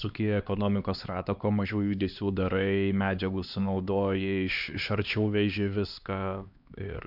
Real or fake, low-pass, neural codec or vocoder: fake; 5.4 kHz; codec, 16 kHz, 2 kbps, X-Codec, HuBERT features, trained on LibriSpeech